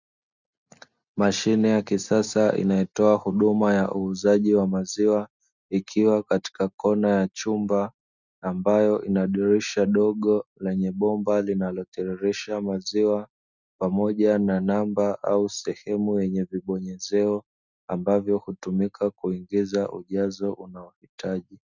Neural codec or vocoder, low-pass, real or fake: none; 7.2 kHz; real